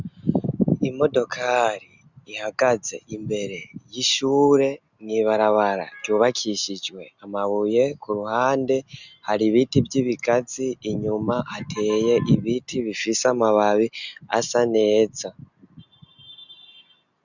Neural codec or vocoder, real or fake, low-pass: none; real; 7.2 kHz